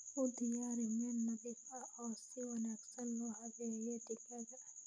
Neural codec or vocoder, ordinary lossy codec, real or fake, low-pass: none; none; real; 9.9 kHz